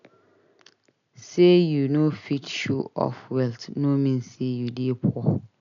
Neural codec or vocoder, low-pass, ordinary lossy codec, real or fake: none; 7.2 kHz; none; real